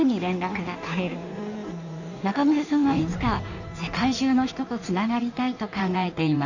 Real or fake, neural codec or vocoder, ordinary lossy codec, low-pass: fake; codec, 16 kHz in and 24 kHz out, 1.1 kbps, FireRedTTS-2 codec; none; 7.2 kHz